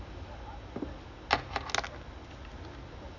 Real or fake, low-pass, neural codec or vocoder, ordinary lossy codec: real; 7.2 kHz; none; none